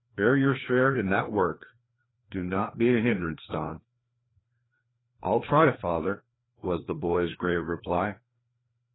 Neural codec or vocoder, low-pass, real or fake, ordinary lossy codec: codec, 16 kHz, 2 kbps, FreqCodec, larger model; 7.2 kHz; fake; AAC, 16 kbps